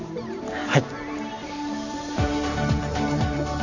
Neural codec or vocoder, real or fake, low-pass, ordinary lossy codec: vocoder, 44.1 kHz, 128 mel bands every 512 samples, BigVGAN v2; fake; 7.2 kHz; none